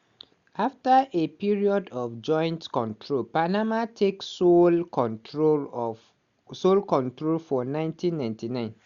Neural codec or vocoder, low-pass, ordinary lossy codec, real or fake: none; 7.2 kHz; Opus, 64 kbps; real